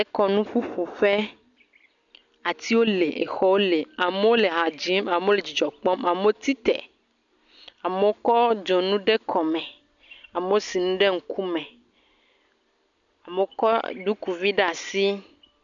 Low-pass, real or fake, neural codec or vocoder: 7.2 kHz; real; none